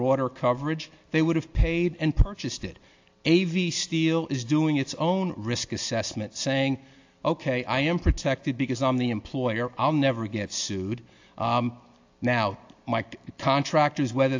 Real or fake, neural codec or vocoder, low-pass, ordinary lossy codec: real; none; 7.2 kHz; AAC, 48 kbps